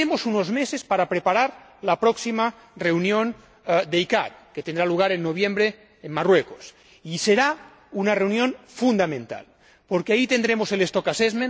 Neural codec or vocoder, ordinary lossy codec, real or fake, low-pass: none; none; real; none